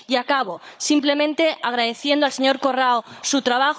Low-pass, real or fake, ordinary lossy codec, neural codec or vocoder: none; fake; none; codec, 16 kHz, 16 kbps, FunCodec, trained on Chinese and English, 50 frames a second